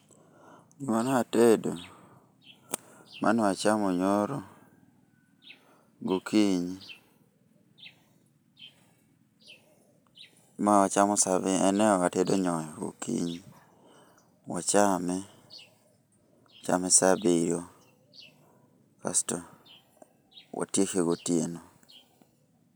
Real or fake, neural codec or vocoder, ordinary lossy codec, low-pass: real; none; none; none